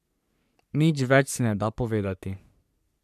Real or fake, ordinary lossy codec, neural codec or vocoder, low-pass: fake; none; codec, 44.1 kHz, 3.4 kbps, Pupu-Codec; 14.4 kHz